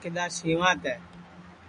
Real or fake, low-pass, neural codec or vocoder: real; 9.9 kHz; none